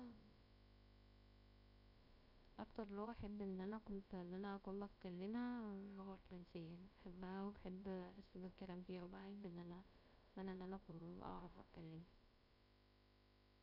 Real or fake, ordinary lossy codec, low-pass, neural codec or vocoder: fake; none; 5.4 kHz; codec, 16 kHz, about 1 kbps, DyCAST, with the encoder's durations